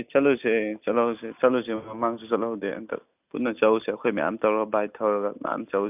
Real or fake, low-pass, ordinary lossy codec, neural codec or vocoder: real; 3.6 kHz; none; none